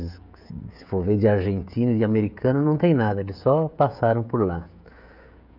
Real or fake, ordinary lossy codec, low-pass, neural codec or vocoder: fake; none; 5.4 kHz; codec, 16 kHz, 16 kbps, FreqCodec, smaller model